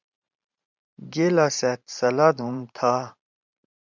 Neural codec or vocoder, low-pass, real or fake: vocoder, 44.1 kHz, 128 mel bands every 512 samples, BigVGAN v2; 7.2 kHz; fake